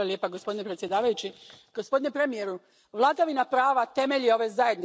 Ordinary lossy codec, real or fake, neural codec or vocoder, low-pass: none; real; none; none